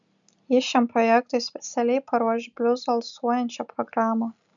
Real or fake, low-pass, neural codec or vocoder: real; 7.2 kHz; none